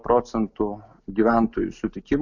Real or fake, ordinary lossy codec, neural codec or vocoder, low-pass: real; MP3, 64 kbps; none; 7.2 kHz